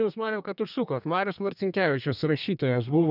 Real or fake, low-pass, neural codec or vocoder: fake; 5.4 kHz; codec, 32 kHz, 1.9 kbps, SNAC